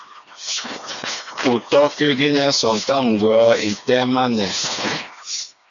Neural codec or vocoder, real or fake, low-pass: codec, 16 kHz, 2 kbps, FreqCodec, smaller model; fake; 7.2 kHz